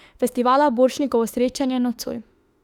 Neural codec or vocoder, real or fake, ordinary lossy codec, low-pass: autoencoder, 48 kHz, 32 numbers a frame, DAC-VAE, trained on Japanese speech; fake; Opus, 64 kbps; 19.8 kHz